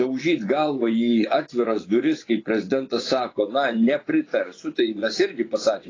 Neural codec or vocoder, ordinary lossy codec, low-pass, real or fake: vocoder, 24 kHz, 100 mel bands, Vocos; AAC, 32 kbps; 7.2 kHz; fake